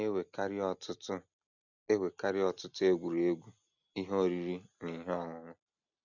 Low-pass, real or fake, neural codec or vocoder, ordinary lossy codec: 7.2 kHz; real; none; none